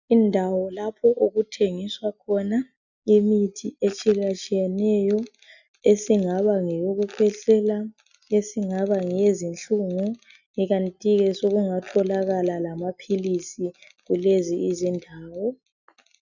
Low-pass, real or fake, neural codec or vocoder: 7.2 kHz; real; none